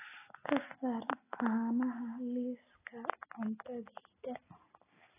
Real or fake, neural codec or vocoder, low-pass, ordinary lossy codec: real; none; 3.6 kHz; AAC, 16 kbps